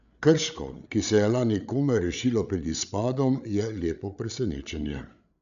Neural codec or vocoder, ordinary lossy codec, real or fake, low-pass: codec, 16 kHz, 8 kbps, FreqCodec, larger model; none; fake; 7.2 kHz